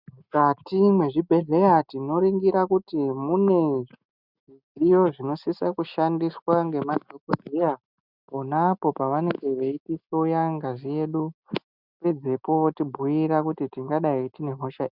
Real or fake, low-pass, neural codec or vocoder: real; 5.4 kHz; none